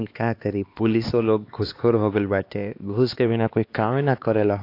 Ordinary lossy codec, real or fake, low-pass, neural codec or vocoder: AAC, 32 kbps; fake; 5.4 kHz; codec, 16 kHz, 2 kbps, X-Codec, HuBERT features, trained on LibriSpeech